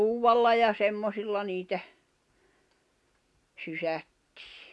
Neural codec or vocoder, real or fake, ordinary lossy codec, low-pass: none; real; none; none